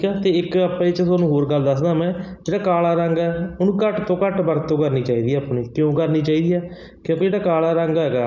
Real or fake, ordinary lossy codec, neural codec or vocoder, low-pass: real; none; none; 7.2 kHz